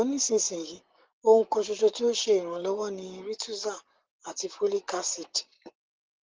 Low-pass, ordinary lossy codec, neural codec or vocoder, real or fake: 7.2 kHz; Opus, 16 kbps; vocoder, 44.1 kHz, 128 mel bands, Pupu-Vocoder; fake